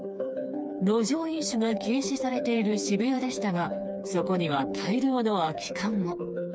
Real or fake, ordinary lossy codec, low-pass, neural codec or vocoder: fake; none; none; codec, 16 kHz, 4 kbps, FreqCodec, smaller model